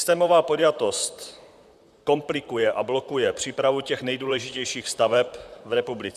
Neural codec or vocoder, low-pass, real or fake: vocoder, 44.1 kHz, 128 mel bands, Pupu-Vocoder; 14.4 kHz; fake